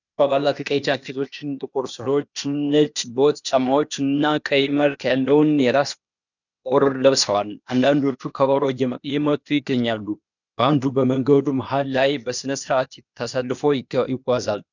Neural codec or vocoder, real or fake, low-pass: codec, 16 kHz, 0.8 kbps, ZipCodec; fake; 7.2 kHz